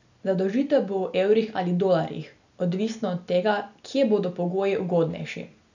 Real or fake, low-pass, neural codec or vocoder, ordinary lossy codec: real; 7.2 kHz; none; none